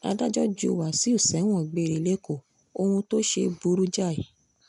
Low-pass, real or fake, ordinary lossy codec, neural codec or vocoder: 10.8 kHz; real; none; none